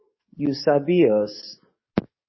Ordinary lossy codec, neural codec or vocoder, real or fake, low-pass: MP3, 24 kbps; codec, 44.1 kHz, 7.8 kbps, DAC; fake; 7.2 kHz